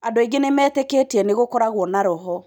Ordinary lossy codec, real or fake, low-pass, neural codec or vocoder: none; real; none; none